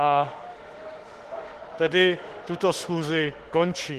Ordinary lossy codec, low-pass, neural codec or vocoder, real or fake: Opus, 16 kbps; 14.4 kHz; autoencoder, 48 kHz, 32 numbers a frame, DAC-VAE, trained on Japanese speech; fake